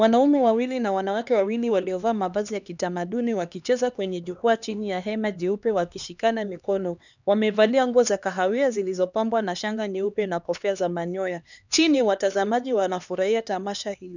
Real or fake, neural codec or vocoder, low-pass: fake; codec, 16 kHz, 2 kbps, X-Codec, HuBERT features, trained on LibriSpeech; 7.2 kHz